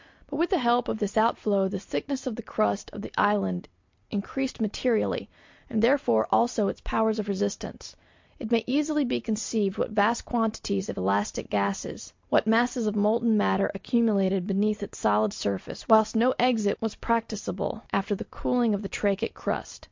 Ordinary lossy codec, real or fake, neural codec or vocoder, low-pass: MP3, 48 kbps; real; none; 7.2 kHz